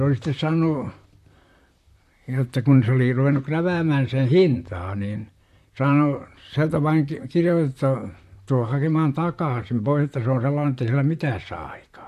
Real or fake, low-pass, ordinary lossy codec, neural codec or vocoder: fake; 14.4 kHz; MP3, 64 kbps; vocoder, 44.1 kHz, 128 mel bands, Pupu-Vocoder